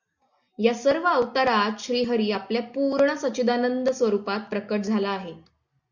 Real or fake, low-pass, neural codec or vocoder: real; 7.2 kHz; none